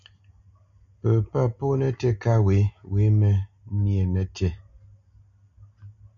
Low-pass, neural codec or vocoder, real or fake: 7.2 kHz; none; real